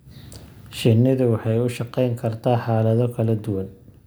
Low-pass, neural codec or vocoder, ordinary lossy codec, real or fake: none; none; none; real